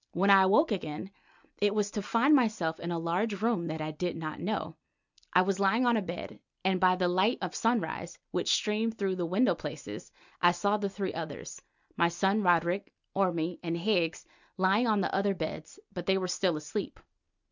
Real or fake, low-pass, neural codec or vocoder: real; 7.2 kHz; none